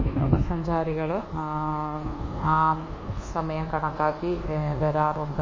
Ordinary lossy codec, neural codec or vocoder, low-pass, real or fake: MP3, 32 kbps; codec, 24 kHz, 1.2 kbps, DualCodec; 7.2 kHz; fake